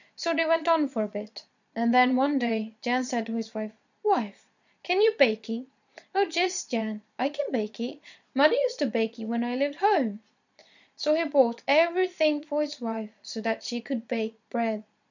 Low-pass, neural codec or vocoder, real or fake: 7.2 kHz; vocoder, 22.05 kHz, 80 mel bands, Vocos; fake